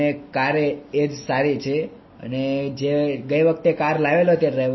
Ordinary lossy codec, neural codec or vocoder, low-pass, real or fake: MP3, 24 kbps; none; 7.2 kHz; real